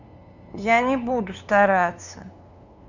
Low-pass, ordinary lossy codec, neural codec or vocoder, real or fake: 7.2 kHz; none; codec, 16 kHz, 2 kbps, FunCodec, trained on LibriTTS, 25 frames a second; fake